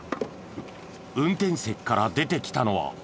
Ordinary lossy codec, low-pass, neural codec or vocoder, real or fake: none; none; none; real